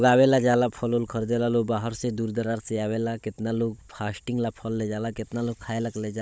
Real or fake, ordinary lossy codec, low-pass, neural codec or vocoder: fake; none; none; codec, 16 kHz, 16 kbps, FunCodec, trained on Chinese and English, 50 frames a second